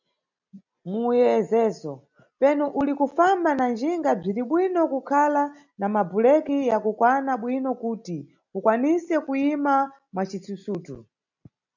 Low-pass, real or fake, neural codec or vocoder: 7.2 kHz; real; none